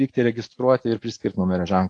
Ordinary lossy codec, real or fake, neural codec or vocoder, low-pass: AAC, 48 kbps; real; none; 9.9 kHz